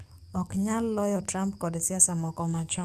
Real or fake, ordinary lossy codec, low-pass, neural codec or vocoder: fake; Opus, 64 kbps; 14.4 kHz; codec, 44.1 kHz, 7.8 kbps, DAC